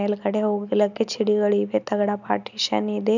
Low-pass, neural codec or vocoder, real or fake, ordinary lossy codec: 7.2 kHz; none; real; none